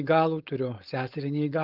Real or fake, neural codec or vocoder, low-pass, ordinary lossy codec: fake; codec, 16 kHz, 4.8 kbps, FACodec; 5.4 kHz; Opus, 32 kbps